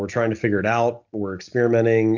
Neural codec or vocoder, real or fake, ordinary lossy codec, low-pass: none; real; MP3, 64 kbps; 7.2 kHz